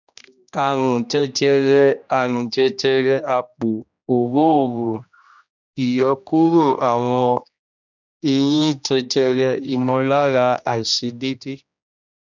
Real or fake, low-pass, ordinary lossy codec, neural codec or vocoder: fake; 7.2 kHz; none; codec, 16 kHz, 1 kbps, X-Codec, HuBERT features, trained on general audio